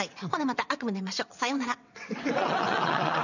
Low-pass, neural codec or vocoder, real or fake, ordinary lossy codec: 7.2 kHz; vocoder, 22.05 kHz, 80 mel bands, WaveNeXt; fake; none